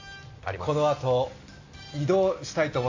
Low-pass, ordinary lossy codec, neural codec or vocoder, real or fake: 7.2 kHz; AAC, 48 kbps; none; real